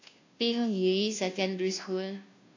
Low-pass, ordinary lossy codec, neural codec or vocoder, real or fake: 7.2 kHz; AAC, 48 kbps; codec, 16 kHz, 0.5 kbps, FunCodec, trained on Chinese and English, 25 frames a second; fake